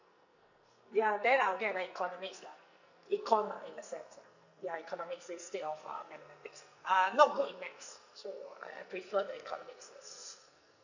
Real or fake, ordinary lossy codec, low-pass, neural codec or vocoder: fake; none; 7.2 kHz; codec, 44.1 kHz, 2.6 kbps, SNAC